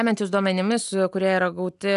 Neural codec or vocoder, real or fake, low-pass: none; real; 10.8 kHz